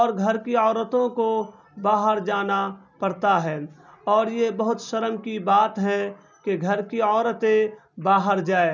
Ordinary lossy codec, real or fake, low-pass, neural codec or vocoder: none; real; 7.2 kHz; none